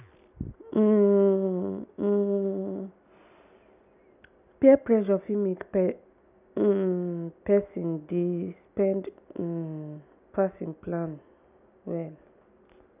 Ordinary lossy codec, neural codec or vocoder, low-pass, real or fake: none; none; 3.6 kHz; real